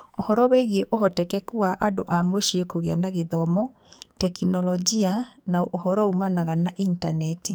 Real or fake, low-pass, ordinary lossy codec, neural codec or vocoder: fake; none; none; codec, 44.1 kHz, 2.6 kbps, SNAC